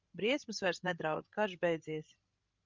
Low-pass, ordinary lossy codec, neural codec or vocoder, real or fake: 7.2 kHz; Opus, 24 kbps; codec, 16 kHz, 16 kbps, FreqCodec, larger model; fake